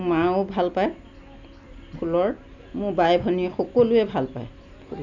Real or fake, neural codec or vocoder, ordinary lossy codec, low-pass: real; none; none; 7.2 kHz